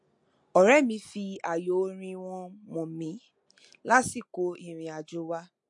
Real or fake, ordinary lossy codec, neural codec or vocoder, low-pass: real; MP3, 48 kbps; none; 10.8 kHz